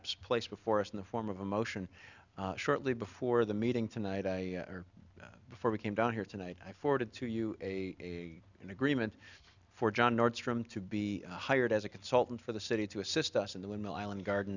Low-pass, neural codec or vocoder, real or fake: 7.2 kHz; none; real